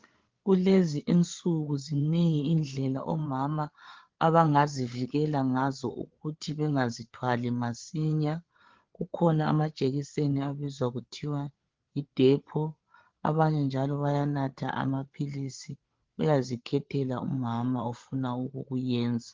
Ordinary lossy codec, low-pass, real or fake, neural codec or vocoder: Opus, 16 kbps; 7.2 kHz; fake; codec, 16 kHz, 8 kbps, FreqCodec, larger model